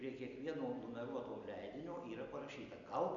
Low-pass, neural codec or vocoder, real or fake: 7.2 kHz; none; real